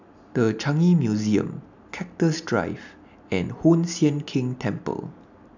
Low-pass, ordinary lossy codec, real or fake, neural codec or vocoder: 7.2 kHz; none; real; none